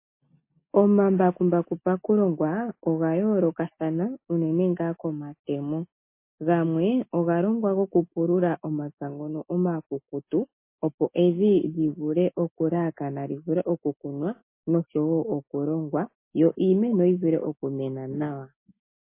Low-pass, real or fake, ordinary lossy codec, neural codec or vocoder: 3.6 kHz; real; MP3, 24 kbps; none